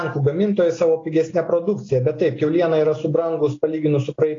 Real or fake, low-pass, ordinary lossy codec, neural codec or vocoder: real; 7.2 kHz; AAC, 32 kbps; none